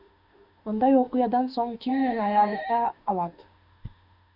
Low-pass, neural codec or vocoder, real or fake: 5.4 kHz; autoencoder, 48 kHz, 32 numbers a frame, DAC-VAE, trained on Japanese speech; fake